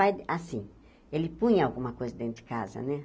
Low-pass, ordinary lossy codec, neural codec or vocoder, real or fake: none; none; none; real